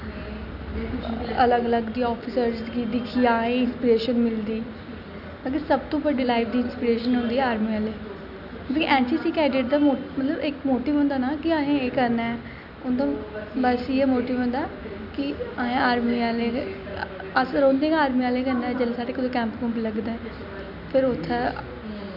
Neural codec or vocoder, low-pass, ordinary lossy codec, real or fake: none; 5.4 kHz; none; real